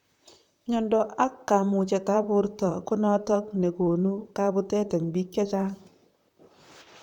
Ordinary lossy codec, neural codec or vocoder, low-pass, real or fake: none; vocoder, 44.1 kHz, 128 mel bands, Pupu-Vocoder; 19.8 kHz; fake